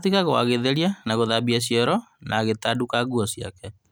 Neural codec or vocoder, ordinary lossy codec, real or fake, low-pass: none; none; real; none